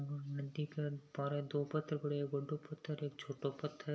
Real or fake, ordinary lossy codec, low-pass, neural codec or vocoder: real; none; none; none